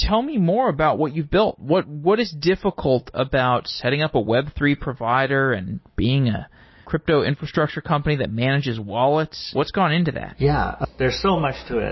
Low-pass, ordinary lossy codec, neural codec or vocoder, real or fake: 7.2 kHz; MP3, 24 kbps; none; real